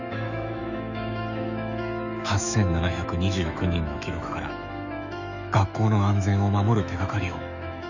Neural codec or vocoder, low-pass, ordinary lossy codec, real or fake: autoencoder, 48 kHz, 128 numbers a frame, DAC-VAE, trained on Japanese speech; 7.2 kHz; none; fake